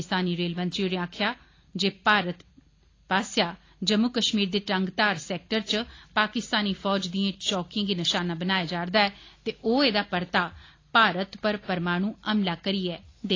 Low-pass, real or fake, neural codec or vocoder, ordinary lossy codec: 7.2 kHz; real; none; AAC, 32 kbps